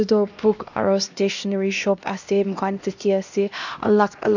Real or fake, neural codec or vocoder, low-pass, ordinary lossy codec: fake; codec, 16 kHz, 1 kbps, X-Codec, HuBERT features, trained on LibriSpeech; 7.2 kHz; none